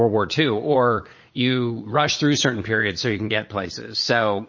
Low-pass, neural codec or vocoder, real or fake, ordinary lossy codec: 7.2 kHz; vocoder, 22.05 kHz, 80 mel bands, Vocos; fake; MP3, 32 kbps